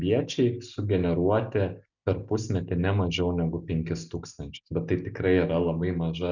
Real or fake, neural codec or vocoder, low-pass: real; none; 7.2 kHz